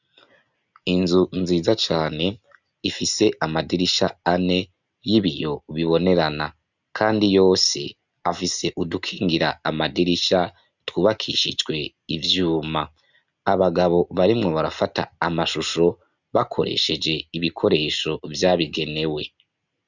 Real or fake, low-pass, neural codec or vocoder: real; 7.2 kHz; none